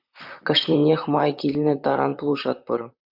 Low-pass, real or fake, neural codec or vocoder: 5.4 kHz; fake; vocoder, 44.1 kHz, 128 mel bands, Pupu-Vocoder